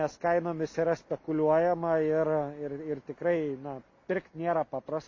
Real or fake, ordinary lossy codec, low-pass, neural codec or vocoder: real; MP3, 32 kbps; 7.2 kHz; none